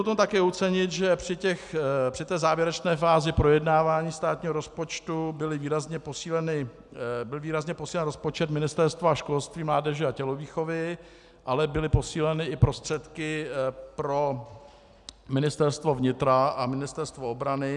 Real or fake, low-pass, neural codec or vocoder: real; 10.8 kHz; none